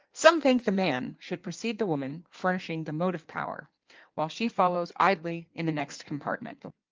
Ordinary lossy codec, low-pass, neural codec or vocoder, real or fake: Opus, 24 kbps; 7.2 kHz; codec, 16 kHz in and 24 kHz out, 1.1 kbps, FireRedTTS-2 codec; fake